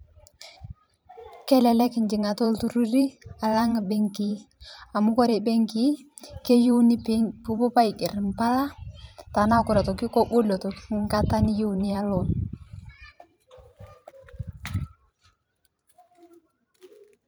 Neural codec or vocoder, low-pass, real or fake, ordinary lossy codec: vocoder, 44.1 kHz, 128 mel bands every 512 samples, BigVGAN v2; none; fake; none